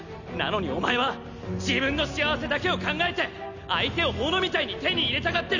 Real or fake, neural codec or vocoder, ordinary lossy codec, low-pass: real; none; none; 7.2 kHz